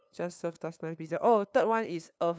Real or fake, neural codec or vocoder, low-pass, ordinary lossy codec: fake; codec, 16 kHz, 2 kbps, FunCodec, trained on LibriTTS, 25 frames a second; none; none